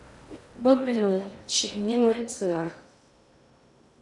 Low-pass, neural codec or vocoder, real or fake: 10.8 kHz; codec, 16 kHz in and 24 kHz out, 0.6 kbps, FocalCodec, streaming, 2048 codes; fake